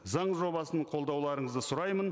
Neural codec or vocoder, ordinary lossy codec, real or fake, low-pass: none; none; real; none